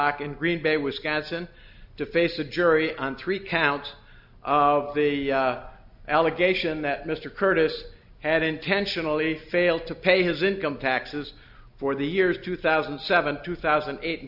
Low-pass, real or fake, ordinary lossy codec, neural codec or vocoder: 5.4 kHz; real; AAC, 48 kbps; none